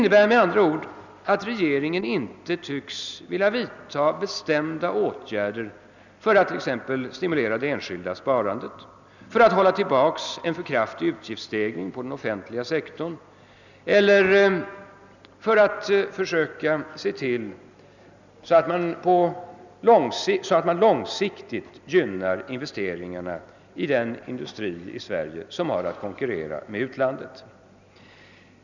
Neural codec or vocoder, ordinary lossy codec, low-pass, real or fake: none; none; 7.2 kHz; real